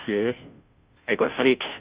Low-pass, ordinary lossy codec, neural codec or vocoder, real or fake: 3.6 kHz; Opus, 64 kbps; codec, 16 kHz, 0.5 kbps, FunCodec, trained on Chinese and English, 25 frames a second; fake